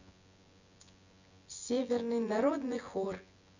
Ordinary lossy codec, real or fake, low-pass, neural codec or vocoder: none; fake; 7.2 kHz; vocoder, 24 kHz, 100 mel bands, Vocos